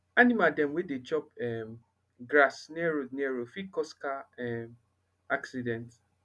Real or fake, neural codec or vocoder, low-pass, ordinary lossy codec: real; none; none; none